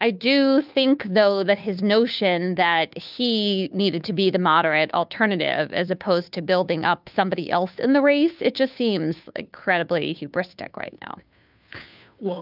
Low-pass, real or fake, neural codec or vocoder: 5.4 kHz; fake; codec, 16 kHz, 2 kbps, FunCodec, trained on Chinese and English, 25 frames a second